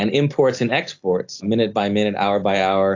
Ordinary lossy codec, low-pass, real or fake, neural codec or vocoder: AAC, 48 kbps; 7.2 kHz; real; none